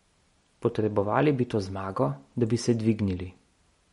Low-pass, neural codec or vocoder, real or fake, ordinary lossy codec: 19.8 kHz; none; real; MP3, 48 kbps